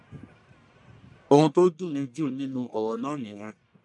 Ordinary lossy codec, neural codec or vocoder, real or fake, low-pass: none; codec, 44.1 kHz, 1.7 kbps, Pupu-Codec; fake; 10.8 kHz